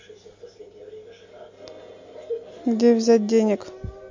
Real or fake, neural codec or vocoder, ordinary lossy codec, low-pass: real; none; MP3, 48 kbps; 7.2 kHz